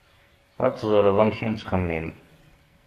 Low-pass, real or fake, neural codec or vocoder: 14.4 kHz; fake; codec, 44.1 kHz, 2.6 kbps, SNAC